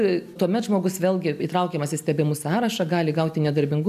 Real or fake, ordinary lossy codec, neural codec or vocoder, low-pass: real; AAC, 96 kbps; none; 14.4 kHz